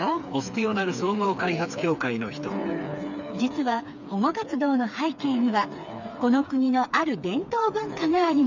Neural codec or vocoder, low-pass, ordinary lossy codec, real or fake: codec, 16 kHz, 4 kbps, FreqCodec, smaller model; 7.2 kHz; none; fake